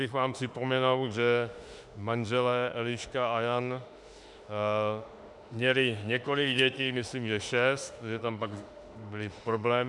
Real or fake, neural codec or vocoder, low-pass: fake; autoencoder, 48 kHz, 32 numbers a frame, DAC-VAE, trained on Japanese speech; 10.8 kHz